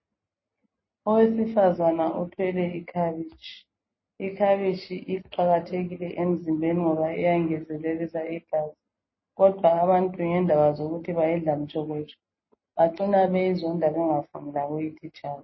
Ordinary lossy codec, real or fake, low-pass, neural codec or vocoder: MP3, 24 kbps; real; 7.2 kHz; none